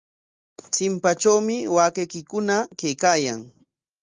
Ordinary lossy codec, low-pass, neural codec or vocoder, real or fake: Opus, 32 kbps; 7.2 kHz; none; real